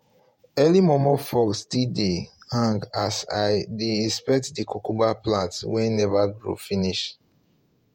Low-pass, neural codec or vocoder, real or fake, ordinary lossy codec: 19.8 kHz; vocoder, 48 kHz, 128 mel bands, Vocos; fake; MP3, 64 kbps